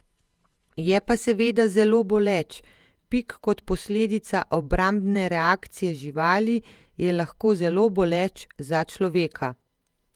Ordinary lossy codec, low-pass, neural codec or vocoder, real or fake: Opus, 32 kbps; 19.8 kHz; vocoder, 48 kHz, 128 mel bands, Vocos; fake